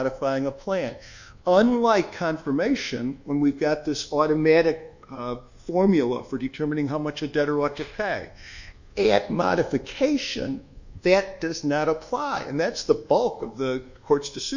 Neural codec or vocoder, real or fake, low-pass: codec, 24 kHz, 1.2 kbps, DualCodec; fake; 7.2 kHz